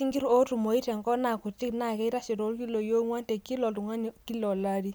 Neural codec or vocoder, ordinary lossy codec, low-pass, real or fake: none; none; none; real